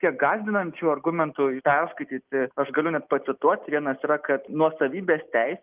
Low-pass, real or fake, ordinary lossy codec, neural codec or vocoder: 3.6 kHz; real; Opus, 32 kbps; none